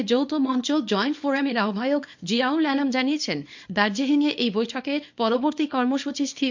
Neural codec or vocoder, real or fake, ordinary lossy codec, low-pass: codec, 24 kHz, 0.9 kbps, WavTokenizer, small release; fake; MP3, 48 kbps; 7.2 kHz